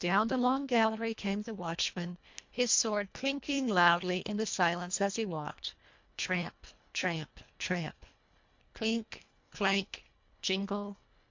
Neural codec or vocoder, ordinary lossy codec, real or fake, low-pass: codec, 24 kHz, 1.5 kbps, HILCodec; MP3, 48 kbps; fake; 7.2 kHz